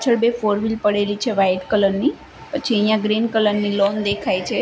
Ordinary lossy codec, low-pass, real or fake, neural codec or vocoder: none; none; real; none